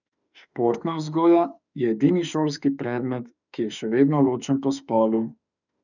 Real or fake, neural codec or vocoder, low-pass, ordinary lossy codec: fake; autoencoder, 48 kHz, 32 numbers a frame, DAC-VAE, trained on Japanese speech; 7.2 kHz; none